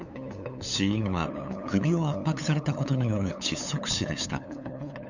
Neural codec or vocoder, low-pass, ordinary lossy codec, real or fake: codec, 16 kHz, 8 kbps, FunCodec, trained on LibriTTS, 25 frames a second; 7.2 kHz; none; fake